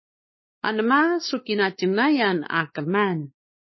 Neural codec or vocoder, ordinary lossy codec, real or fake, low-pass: codec, 16 kHz, 4 kbps, X-Codec, WavLM features, trained on Multilingual LibriSpeech; MP3, 24 kbps; fake; 7.2 kHz